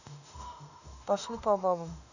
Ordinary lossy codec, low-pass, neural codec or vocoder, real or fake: none; 7.2 kHz; autoencoder, 48 kHz, 32 numbers a frame, DAC-VAE, trained on Japanese speech; fake